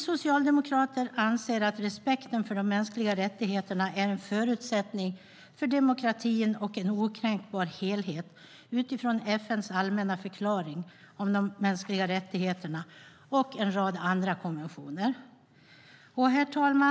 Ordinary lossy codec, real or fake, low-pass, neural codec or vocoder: none; real; none; none